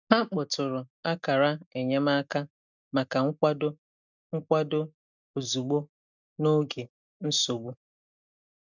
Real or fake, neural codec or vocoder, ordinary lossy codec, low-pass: real; none; none; 7.2 kHz